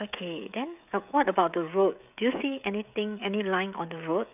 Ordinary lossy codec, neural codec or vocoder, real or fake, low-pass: none; codec, 16 kHz, 16 kbps, FreqCodec, smaller model; fake; 3.6 kHz